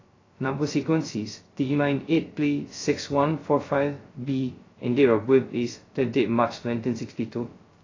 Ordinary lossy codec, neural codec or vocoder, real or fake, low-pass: AAC, 32 kbps; codec, 16 kHz, 0.2 kbps, FocalCodec; fake; 7.2 kHz